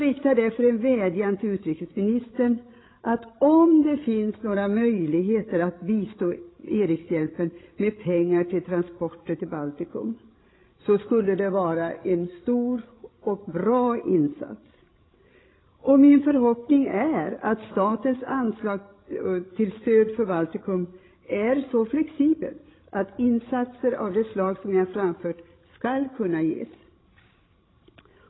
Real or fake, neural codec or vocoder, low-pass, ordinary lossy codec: fake; codec, 16 kHz, 16 kbps, FreqCodec, larger model; 7.2 kHz; AAC, 16 kbps